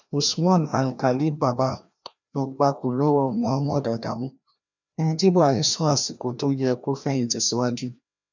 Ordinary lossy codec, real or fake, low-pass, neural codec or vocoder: none; fake; 7.2 kHz; codec, 16 kHz, 1 kbps, FreqCodec, larger model